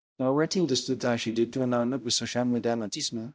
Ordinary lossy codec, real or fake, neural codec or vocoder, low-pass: none; fake; codec, 16 kHz, 0.5 kbps, X-Codec, HuBERT features, trained on balanced general audio; none